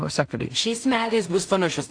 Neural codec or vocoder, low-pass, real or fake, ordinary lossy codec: codec, 16 kHz in and 24 kHz out, 0.4 kbps, LongCat-Audio-Codec, two codebook decoder; 9.9 kHz; fake; AAC, 64 kbps